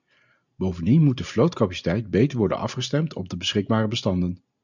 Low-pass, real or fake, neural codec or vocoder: 7.2 kHz; real; none